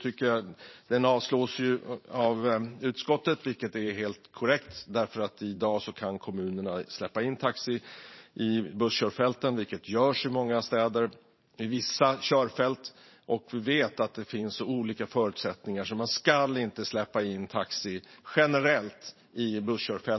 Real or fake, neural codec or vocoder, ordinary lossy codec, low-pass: fake; vocoder, 44.1 kHz, 128 mel bands every 512 samples, BigVGAN v2; MP3, 24 kbps; 7.2 kHz